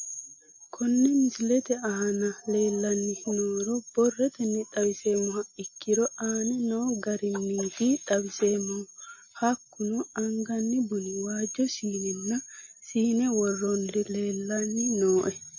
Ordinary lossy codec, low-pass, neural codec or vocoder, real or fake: MP3, 32 kbps; 7.2 kHz; none; real